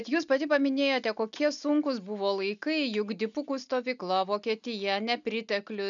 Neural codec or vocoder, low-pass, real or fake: none; 7.2 kHz; real